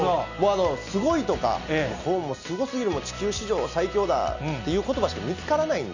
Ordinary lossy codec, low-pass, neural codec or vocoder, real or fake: none; 7.2 kHz; none; real